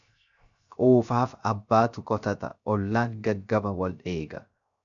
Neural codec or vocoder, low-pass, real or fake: codec, 16 kHz, 0.3 kbps, FocalCodec; 7.2 kHz; fake